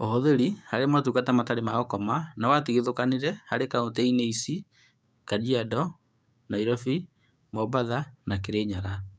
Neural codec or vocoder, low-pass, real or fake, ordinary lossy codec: codec, 16 kHz, 6 kbps, DAC; none; fake; none